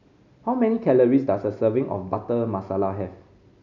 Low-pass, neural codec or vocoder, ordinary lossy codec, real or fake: 7.2 kHz; none; none; real